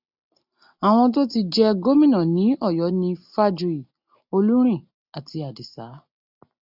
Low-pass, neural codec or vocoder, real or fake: 5.4 kHz; none; real